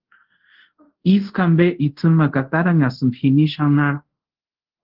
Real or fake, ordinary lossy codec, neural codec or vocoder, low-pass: fake; Opus, 16 kbps; codec, 24 kHz, 0.5 kbps, DualCodec; 5.4 kHz